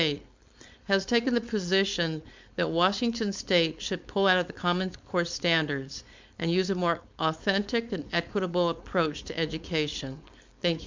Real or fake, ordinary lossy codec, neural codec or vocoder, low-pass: fake; MP3, 64 kbps; codec, 16 kHz, 4.8 kbps, FACodec; 7.2 kHz